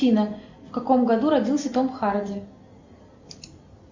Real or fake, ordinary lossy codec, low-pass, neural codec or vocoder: real; MP3, 64 kbps; 7.2 kHz; none